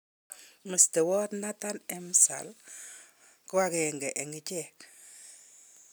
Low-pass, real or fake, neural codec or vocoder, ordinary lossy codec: none; real; none; none